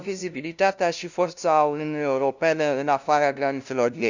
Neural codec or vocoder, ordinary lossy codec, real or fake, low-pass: codec, 16 kHz, 0.5 kbps, FunCodec, trained on LibriTTS, 25 frames a second; none; fake; 7.2 kHz